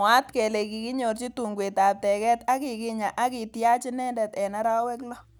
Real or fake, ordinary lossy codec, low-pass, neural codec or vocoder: real; none; none; none